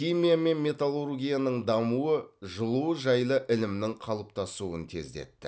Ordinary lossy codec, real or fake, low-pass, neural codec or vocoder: none; real; none; none